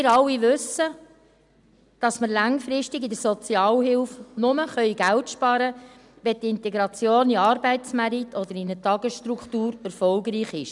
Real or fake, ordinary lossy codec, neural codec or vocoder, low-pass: real; none; none; 10.8 kHz